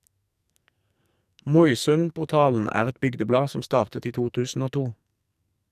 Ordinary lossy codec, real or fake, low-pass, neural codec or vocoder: none; fake; 14.4 kHz; codec, 44.1 kHz, 2.6 kbps, SNAC